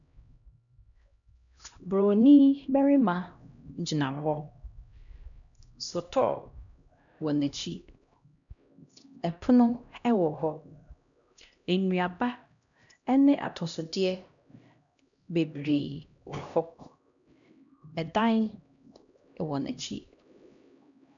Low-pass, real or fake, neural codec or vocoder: 7.2 kHz; fake; codec, 16 kHz, 1 kbps, X-Codec, HuBERT features, trained on LibriSpeech